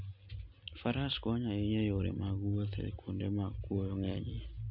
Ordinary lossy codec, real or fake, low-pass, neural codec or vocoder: none; real; 5.4 kHz; none